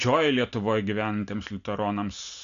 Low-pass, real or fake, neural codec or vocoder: 7.2 kHz; real; none